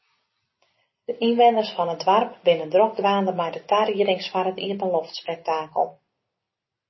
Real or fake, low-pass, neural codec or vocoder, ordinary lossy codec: real; 7.2 kHz; none; MP3, 24 kbps